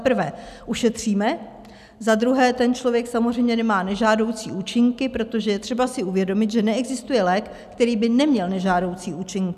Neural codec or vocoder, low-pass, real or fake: none; 14.4 kHz; real